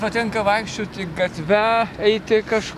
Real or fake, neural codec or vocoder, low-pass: real; none; 14.4 kHz